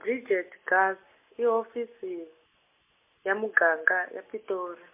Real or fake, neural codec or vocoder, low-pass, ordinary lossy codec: real; none; 3.6 kHz; MP3, 24 kbps